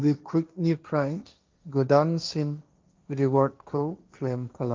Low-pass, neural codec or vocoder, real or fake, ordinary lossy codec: 7.2 kHz; codec, 16 kHz, 1.1 kbps, Voila-Tokenizer; fake; Opus, 24 kbps